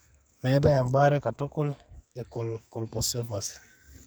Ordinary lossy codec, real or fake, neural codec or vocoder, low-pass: none; fake; codec, 44.1 kHz, 2.6 kbps, SNAC; none